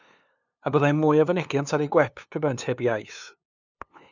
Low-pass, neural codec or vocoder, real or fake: 7.2 kHz; codec, 16 kHz, 2 kbps, FunCodec, trained on LibriTTS, 25 frames a second; fake